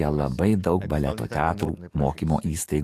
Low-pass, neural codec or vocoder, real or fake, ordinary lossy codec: 14.4 kHz; codec, 44.1 kHz, 7.8 kbps, DAC; fake; AAC, 64 kbps